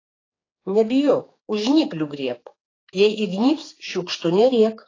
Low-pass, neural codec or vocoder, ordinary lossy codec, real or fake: 7.2 kHz; codec, 16 kHz, 4 kbps, X-Codec, HuBERT features, trained on general audio; AAC, 32 kbps; fake